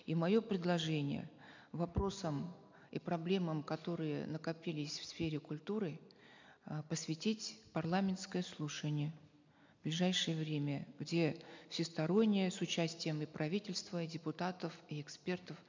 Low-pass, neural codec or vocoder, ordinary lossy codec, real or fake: 7.2 kHz; none; MP3, 64 kbps; real